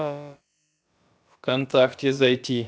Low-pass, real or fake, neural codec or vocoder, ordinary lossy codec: none; fake; codec, 16 kHz, about 1 kbps, DyCAST, with the encoder's durations; none